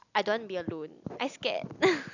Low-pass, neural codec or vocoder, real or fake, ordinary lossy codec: 7.2 kHz; none; real; none